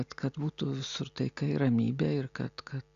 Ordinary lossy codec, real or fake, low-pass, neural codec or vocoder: Opus, 64 kbps; real; 7.2 kHz; none